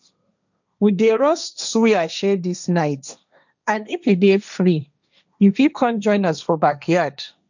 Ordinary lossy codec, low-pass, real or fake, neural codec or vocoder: none; 7.2 kHz; fake; codec, 16 kHz, 1.1 kbps, Voila-Tokenizer